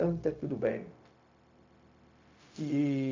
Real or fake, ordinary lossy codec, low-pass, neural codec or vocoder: fake; MP3, 48 kbps; 7.2 kHz; codec, 16 kHz, 0.4 kbps, LongCat-Audio-Codec